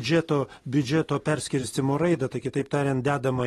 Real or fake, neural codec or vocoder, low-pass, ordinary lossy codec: real; none; 19.8 kHz; AAC, 32 kbps